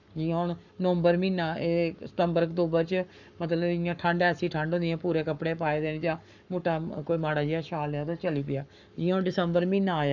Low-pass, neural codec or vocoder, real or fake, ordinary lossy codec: 7.2 kHz; codec, 44.1 kHz, 7.8 kbps, Pupu-Codec; fake; none